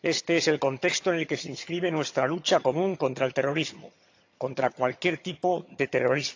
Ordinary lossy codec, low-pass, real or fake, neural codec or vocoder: AAC, 48 kbps; 7.2 kHz; fake; vocoder, 22.05 kHz, 80 mel bands, HiFi-GAN